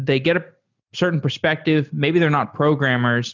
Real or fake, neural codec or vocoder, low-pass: real; none; 7.2 kHz